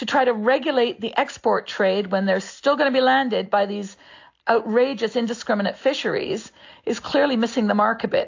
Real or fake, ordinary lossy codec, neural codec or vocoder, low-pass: real; AAC, 48 kbps; none; 7.2 kHz